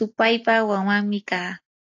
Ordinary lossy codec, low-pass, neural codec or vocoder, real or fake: AAC, 48 kbps; 7.2 kHz; none; real